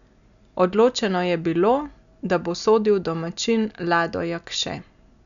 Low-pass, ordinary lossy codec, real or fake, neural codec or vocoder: 7.2 kHz; none; real; none